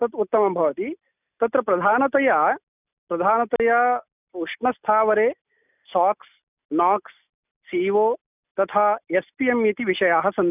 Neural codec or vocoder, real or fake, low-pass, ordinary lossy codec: none; real; 3.6 kHz; none